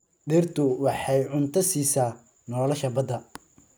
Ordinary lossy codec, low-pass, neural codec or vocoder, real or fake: none; none; none; real